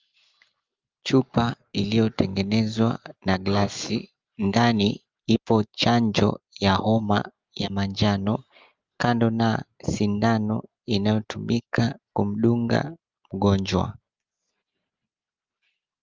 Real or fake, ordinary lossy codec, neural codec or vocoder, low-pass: real; Opus, 32 kbps; none; 7.2 kHz